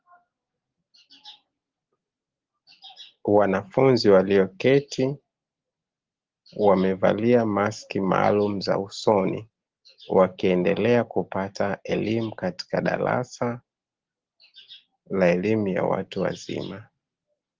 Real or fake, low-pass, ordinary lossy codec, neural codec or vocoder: real; 7.2 kHz; Opus, 16 kbps; none